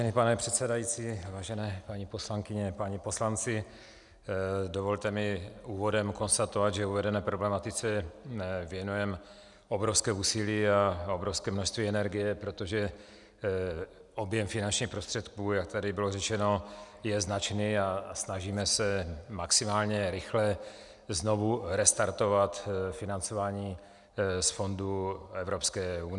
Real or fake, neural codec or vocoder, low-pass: real; none; 10.8 kHz